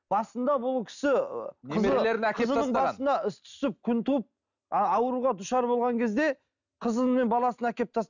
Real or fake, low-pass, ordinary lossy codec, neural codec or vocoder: real; 7.2 kHz; none; none